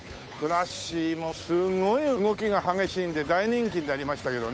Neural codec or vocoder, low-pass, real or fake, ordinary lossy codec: codec, 16 kHz, 8 kbps, FunCodec, trained on Chinese and English, 25 frames a second; none; fake; none